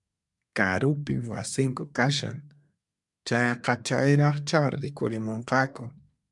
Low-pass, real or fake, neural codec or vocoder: 10.8 kHz; fake; codec, 24 kHz, 1 kbps, SNAC